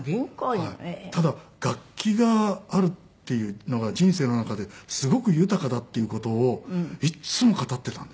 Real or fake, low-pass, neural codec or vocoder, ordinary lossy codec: real; none; none; none